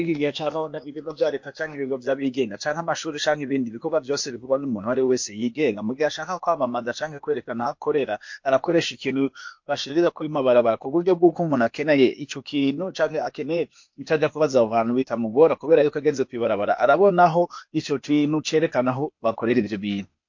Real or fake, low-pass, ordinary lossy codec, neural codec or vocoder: fake; 7.2 kHz; MP3, 48 kbps; codec, 16 kHz, 0.8 kbps, ZipCodec